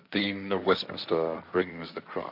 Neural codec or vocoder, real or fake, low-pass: codec, 16 kHz, 1.1 kbps, Voila-Tokenizer; fake; 5.4 kHz